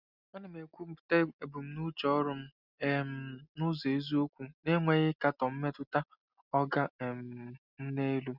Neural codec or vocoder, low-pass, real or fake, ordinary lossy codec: none; 5.4 kHz; real; Opus, 64 kbps